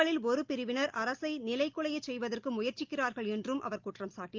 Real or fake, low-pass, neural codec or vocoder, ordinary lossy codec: real; 7.2 kHz; none; Opus, 32 kbps